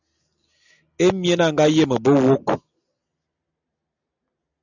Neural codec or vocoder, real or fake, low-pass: none; real; 7.2 kHz